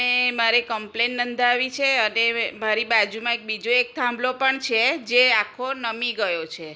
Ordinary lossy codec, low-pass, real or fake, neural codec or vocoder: none; none; real; none